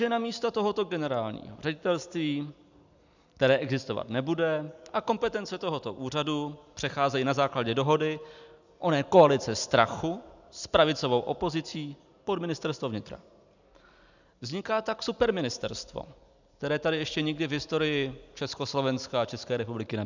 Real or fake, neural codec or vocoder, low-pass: real; none; 7.2 kHz